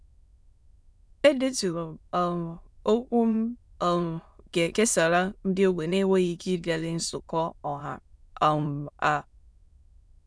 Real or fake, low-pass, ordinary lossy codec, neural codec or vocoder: fake; none; none; autoencoder, 22.05 kHz, a latent of 192 numbers a frame, VITS, trained on many speakers